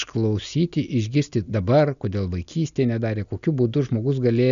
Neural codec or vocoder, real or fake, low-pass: none; real; 7.2 kHz